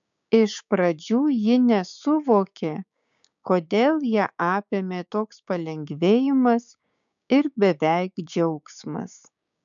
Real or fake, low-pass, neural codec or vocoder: fake; 7.2 kHz; codec, 16 kHz, 6 kbps, DAC